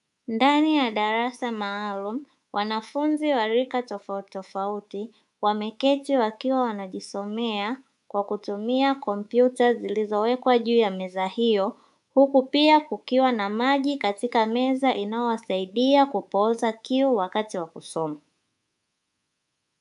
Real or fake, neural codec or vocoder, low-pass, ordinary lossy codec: fake; codec, 24 kHz, 3.1 kbps, DualCodec; 10.8 kHz; AAC, 96 kbps